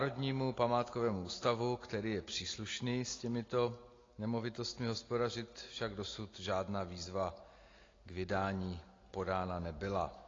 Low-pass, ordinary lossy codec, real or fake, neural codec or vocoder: 7.2 kHz; AAC, 32 kbps; real; none